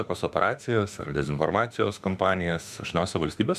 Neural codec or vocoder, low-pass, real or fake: autoencoder, 48 kHz, 32 numbers a frame, DAC-VAE, trained on Japanese speech; 14.4 kHz; fake